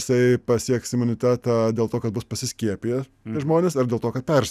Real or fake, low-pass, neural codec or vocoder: real; 14.4 kHz; none